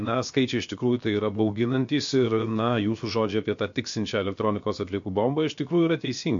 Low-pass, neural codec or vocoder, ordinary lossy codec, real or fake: 7.2 kHz; codec, 16 kHz, 0.7 kbps, FocalCodec; MP3, 48 kbps; fake